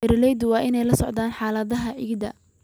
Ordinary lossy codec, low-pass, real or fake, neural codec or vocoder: none; none; real; none